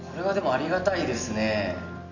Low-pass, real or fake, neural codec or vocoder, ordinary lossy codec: 7.2 kHz; real; none; none